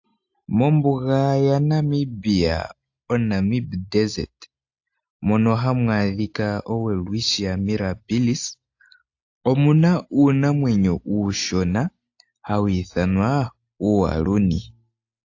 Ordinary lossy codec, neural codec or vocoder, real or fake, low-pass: AAC, 48 kbps; none; real; 7.2 kHz